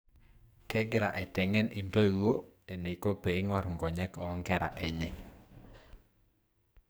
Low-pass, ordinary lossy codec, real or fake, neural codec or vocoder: none; none; fake; codec, 44.1 kHz, 2.6 kbps, SNAC